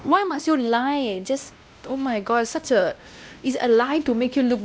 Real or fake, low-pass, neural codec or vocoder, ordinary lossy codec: fake; none; codec, 16 kHz, 1 kbps, X-Codec, WavLM features, trained on Multilingual LibriSpeech; none